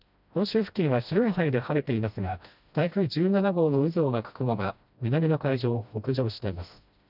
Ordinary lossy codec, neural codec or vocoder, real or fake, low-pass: none; codec, 16 kHz, 1 kbps, FreqCodec, smaller model; fake; 5.4 kHz